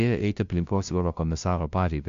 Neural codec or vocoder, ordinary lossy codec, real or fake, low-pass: codec, 16 kHz, 0.5 kbps, FunCodec, trained on LibriTTS, 25 frames a second; MP3, 64 kbps; fake; 7.2 kHz